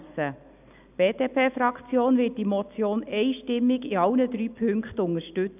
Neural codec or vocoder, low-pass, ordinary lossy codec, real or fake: none; 3.6 kHz; none; real